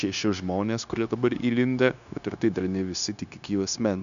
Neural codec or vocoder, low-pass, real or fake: codec, 16 kHz, 0.9 kbps, LongCat-Audio-Codec; 7.2 kHz; fake